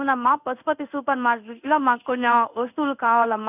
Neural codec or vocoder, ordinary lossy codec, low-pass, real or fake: codec, 16 kHz in and 24 kHz out, 1 kbps, XY-Tokenizer; none; 3.6 kHz; fake